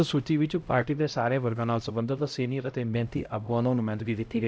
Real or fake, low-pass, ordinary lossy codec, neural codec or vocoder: fake; none; none; codec, 16 kHz, 0.5 kbps, X-Codec, HuBERT features, trained on LibriSpeech